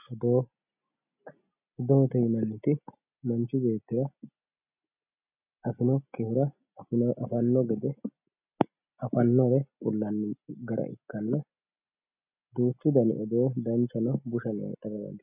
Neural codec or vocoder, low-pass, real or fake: none; 3.6 kHz; real